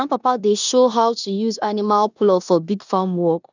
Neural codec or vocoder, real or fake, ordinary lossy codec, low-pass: codec, 16 kHz in and 24 kHz out, 0.9 kbps, LongCat-Audio-Codec, four codebook decoder; fake; none; 7.2 kHz